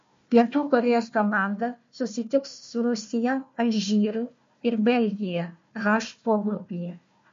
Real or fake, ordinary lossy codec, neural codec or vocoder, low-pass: fake; MP3, 48 kbps; codec, 16 kHz, 1 kbps, FunCodec, trained on Chinese and English, 50 frames a second; 7.2 kHz